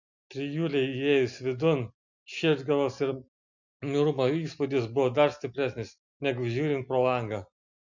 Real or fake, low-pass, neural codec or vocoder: real; 7.2 kHz; none